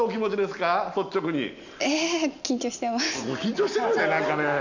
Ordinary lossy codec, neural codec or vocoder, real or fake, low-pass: none; none; real; 7.2 kHz